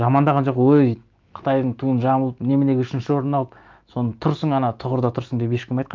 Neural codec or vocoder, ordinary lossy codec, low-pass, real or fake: autoencoder, 48 kHz, 128 numbers a frame, DAC-VAE, trained on Japanese speech; Opus, 24 kbps; 7.2 kHz; fake